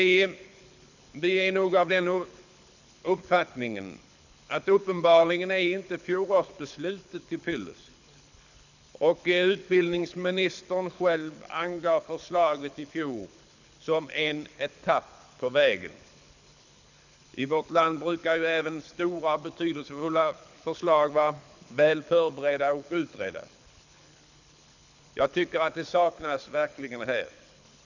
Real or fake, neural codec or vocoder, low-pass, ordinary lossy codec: fake; codec, 24 kHz, 6 kbps, HILCodec; 7.2 kHz; none